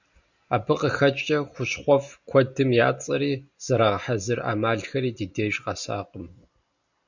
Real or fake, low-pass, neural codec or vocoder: real; 7.2 kHz; none